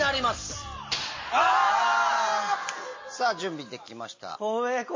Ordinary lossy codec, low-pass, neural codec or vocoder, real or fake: MP3, 32 kbps; 7.2 kHz; none; real